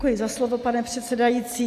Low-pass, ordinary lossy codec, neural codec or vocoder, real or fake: 14.4 kHz; AAC, 64 kbps; none; real